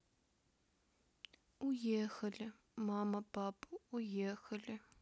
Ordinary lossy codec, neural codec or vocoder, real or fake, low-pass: none; none; real; none